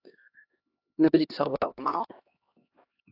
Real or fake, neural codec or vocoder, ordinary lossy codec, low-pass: fake; codec, 16 kHz, 1 kbps, X-Codec, HuBERT features, trained on LibriSpeech; AAC, 32 kbps; 5.4 kHz